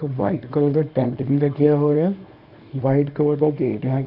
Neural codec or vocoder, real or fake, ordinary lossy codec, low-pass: codec, 24 kHz, 0.9 kbps, WavTokenizer, small release; fake; AAC, 48 kbps; 5.4 kHz